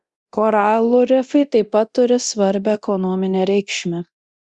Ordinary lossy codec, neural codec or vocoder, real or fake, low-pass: Opus, 64 kbps; codec, 24 kHz, 0.9 kbps, DualCodec; fake; 10.8 kHz